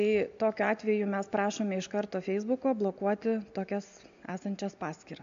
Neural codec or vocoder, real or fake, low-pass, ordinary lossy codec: none; real; 7.2 kHz; MP3, 64 kbps